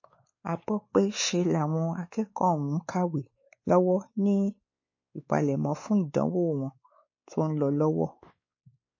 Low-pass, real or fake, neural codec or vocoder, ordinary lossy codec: 7.2 kHz; fake; codec, 16 kHz, 4 kbps, X-Codec, WavLM features, trained on Multilingual LibriSpeech; MP3, 32 kbps